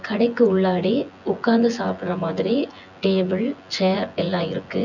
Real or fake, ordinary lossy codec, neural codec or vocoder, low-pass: fake; none; vocoder, 24 kHz, 100 mel bands, Vocos; 7.2 kHz